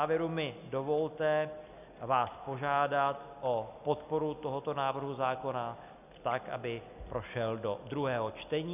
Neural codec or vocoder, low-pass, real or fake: none; 3.6 kHz; real